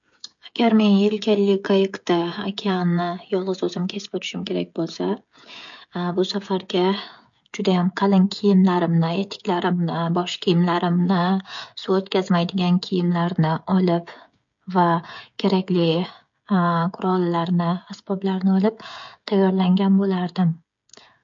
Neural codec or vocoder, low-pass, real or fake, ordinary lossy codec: codec, 16 kHz, 16 kbps, FreqCodec, smaller model; 7.2 kHz; fake; MP3, 48 kbps